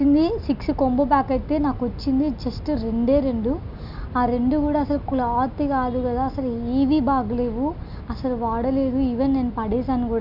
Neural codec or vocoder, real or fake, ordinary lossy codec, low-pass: none; real; none; 5.4 kHz